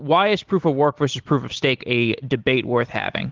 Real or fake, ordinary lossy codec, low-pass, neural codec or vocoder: real; Opus, 16 kbps; 7.2 kHz; none